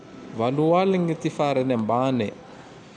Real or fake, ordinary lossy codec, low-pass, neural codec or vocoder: real; none; 9.9 kHz; none